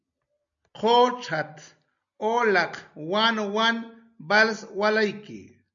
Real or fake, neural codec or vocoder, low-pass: real; none; 7.2 kHz